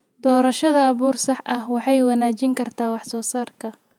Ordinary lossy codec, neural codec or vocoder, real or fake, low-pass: none; vocoder, 48 kHz, 128 mel bands, Vocos; fake; 19.8 kHz